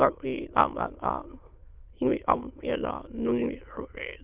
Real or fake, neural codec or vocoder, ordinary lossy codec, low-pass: fake; autoencoder, 22.05 kHz, a latent of 192 numbers a frame, VITS, trained on many speakers; Opus, 32 kbps; 3.6 kHz